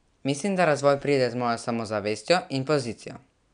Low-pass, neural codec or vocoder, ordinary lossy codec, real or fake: 9.9 kHz; none; none; real